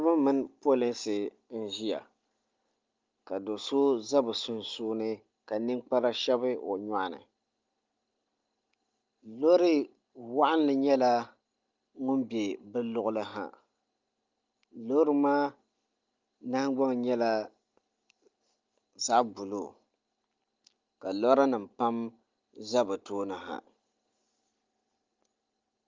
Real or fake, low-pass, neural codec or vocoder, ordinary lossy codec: real; 7.2 kHz; none; Opus, 32 kbps